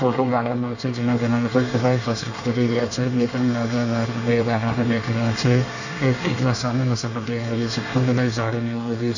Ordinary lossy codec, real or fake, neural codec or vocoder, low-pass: none; fake; codec, 24 kHz, 1 kbps, SNAC; 7.2 kHz